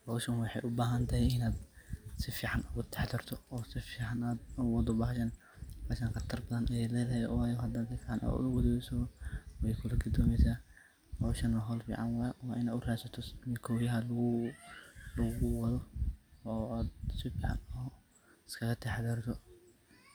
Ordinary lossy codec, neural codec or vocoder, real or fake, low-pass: none; none; real; none